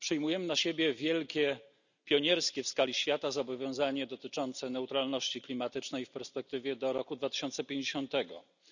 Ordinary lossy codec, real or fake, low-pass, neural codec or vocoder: none; real; 7.2 kHz; none